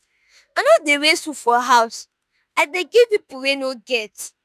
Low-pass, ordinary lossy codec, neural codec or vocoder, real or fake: 14.4 kHz; none; autoencoder, 48 kHz, 32 numbers a frame, DAC-VAE, trained on Japanese speech; fake